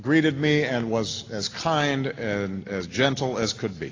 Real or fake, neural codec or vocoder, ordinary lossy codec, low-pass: real; none; AAC, 32 kbps; 7.2 kHz